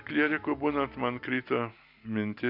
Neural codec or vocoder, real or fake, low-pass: none; real; 5.4 kHz